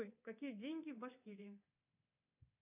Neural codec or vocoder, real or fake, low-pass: codec, 24 kHz, 3.1 kbps, DualCodec; fake; 3.6 kHz